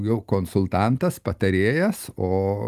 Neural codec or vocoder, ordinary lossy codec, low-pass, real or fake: none; Opus, 32 kbps; 14.4 kHz; real